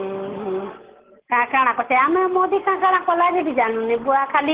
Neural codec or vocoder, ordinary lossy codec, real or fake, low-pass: none; Opus, 16 kbps; real; 3.6 kHz